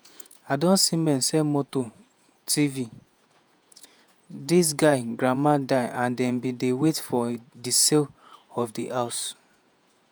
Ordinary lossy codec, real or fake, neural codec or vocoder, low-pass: none; fake; vocoder, 48 kHz, 128 mel bands, Vocos; none